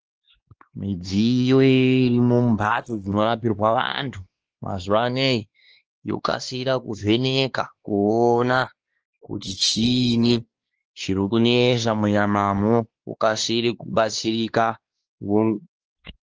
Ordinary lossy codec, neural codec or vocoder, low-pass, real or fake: Opus, 16 kbps; codec, 16 kHz, 2 kbps, X-Codec, WavLM features, trained on Multilingual LibriSpeech; 7.2 kHz; fake